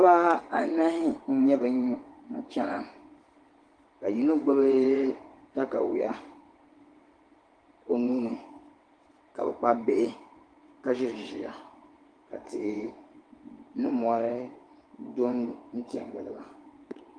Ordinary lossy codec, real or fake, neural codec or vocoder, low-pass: Opus, 24 kbps; fake; vocoder, 22.05 kHz, 80 mel bands, WaveNeXt; 9.9 kHz